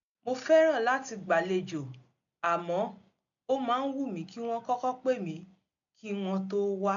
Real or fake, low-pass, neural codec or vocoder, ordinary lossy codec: real; 7.2 kHz; none; none